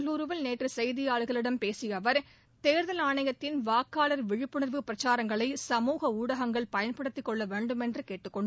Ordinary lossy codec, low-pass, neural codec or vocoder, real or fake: none; none; none; real